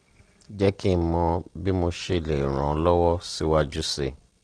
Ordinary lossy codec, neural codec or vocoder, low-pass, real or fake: Opus, 16 kbps; none; 9.9 kHz; real